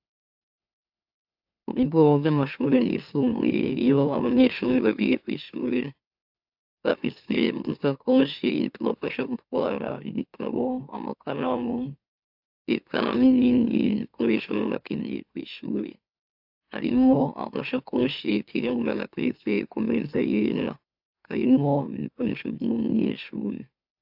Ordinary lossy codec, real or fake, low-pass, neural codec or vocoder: AAC, 48 kbps; fake; 5.4 kHz; autoencoder, 44.1 kHz, a latent of 192 numbers a frame, MeloTTS